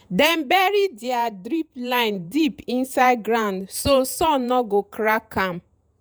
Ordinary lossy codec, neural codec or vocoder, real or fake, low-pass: none; vocoder, 48 kHz, 128 mel bands, Vocos; fake; none